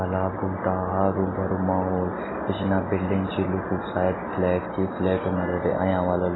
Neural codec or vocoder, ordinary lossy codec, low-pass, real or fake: none; AAC, 16 kbps; 7.2 kHz; real